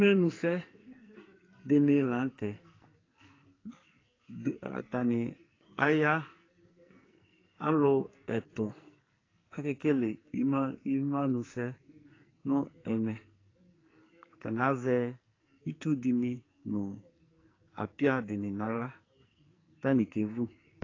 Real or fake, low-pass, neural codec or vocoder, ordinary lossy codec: fake; 7.2 kHz; codec, 44.1 kHz, 2.6 kbps, SNAC; AAC, 32 kbps